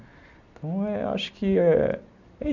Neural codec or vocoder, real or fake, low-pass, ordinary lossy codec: none; real; 7.2 kHz; none